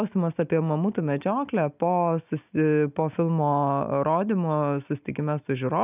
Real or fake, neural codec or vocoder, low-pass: real; none; 3.6 kHz